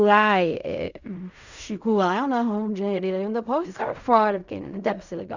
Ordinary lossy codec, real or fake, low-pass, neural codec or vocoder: none; fake; 7.2 kHz; codec, 16 kHz in and 24 kHz out, 0.4 kbps, LongCat-Audio-Codec, fine tuned four codebook decoder